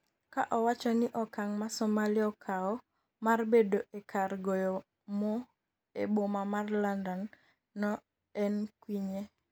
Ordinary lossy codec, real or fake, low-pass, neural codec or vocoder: none; real; none; none